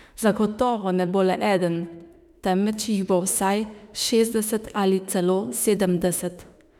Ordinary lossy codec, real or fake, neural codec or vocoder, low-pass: none; fake; autoencoder, 48 kHz, 32 numbers a frame, DAC-VAE, trained on Japanese speech; 19.8 kHz